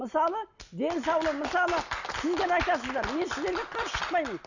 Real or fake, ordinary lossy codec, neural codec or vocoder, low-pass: real; none; none; 7.2 kHz